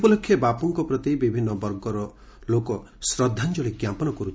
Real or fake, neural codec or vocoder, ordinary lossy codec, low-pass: real; none; none; none